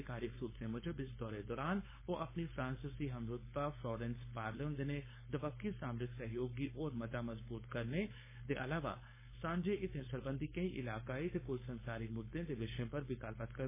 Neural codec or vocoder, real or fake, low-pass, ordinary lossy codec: codec, 16 kHz, 4.8 kbps, FACodec; fake; 3.6 kHz; MP3, 16 kbps